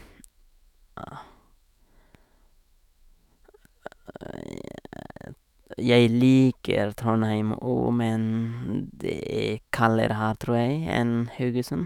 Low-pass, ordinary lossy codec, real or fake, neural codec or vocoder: 19.8 kHz; none; fake; autoencoder, 48 kHz, 128 numbers a frame, DAC-VAE, trained on Japanese speech